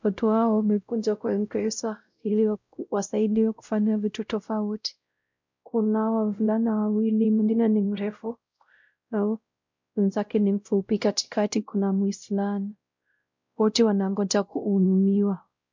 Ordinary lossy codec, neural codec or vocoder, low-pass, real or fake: MP3, 64 kbps; codec, 16 kHz, 0.5 kbps, X-Codec, WavLM features, trained on Multilingual LibriSpeech; 7.2 kHz; fake